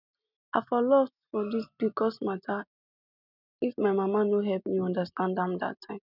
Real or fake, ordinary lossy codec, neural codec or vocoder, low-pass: real; none; none; 5.4 kHz